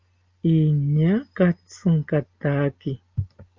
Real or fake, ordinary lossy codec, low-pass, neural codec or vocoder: real; Opus, 32 kbps; 7.2 kHz; none